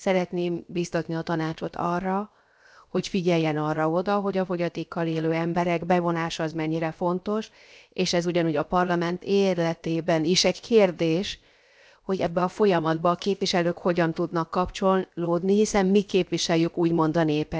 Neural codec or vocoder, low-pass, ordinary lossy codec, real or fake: codec, 16 kHz, 0.7 kbps, FocalCodec; none; none; fake